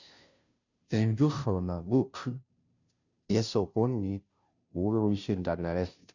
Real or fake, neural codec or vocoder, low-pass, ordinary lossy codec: fake; codec, 16 kHz, 0.5 kbps, FunCodec, trained on Chinese and English, 25 frames a second; 7.2 kHz; none